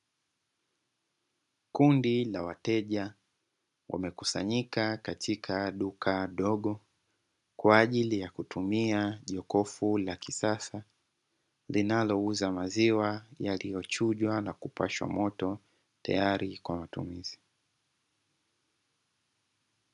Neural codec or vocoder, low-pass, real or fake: none; 10.8 kHz; real